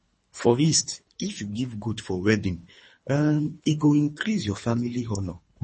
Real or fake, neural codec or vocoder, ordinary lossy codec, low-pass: fake; codec, 24 kHz, 3 kbps, HILCodec; MP3, 32 kbps; 10.8 kHz